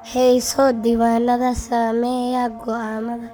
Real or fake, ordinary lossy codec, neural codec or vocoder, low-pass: fake; none; codec, 44.1 kHz, 7.8 kbps, Pupu-Codec; none